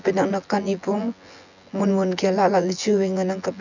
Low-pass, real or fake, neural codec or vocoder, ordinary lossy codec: 7.2 kHz; fake; vocoder, 24 kHz, 100 mel bands, Vocos; none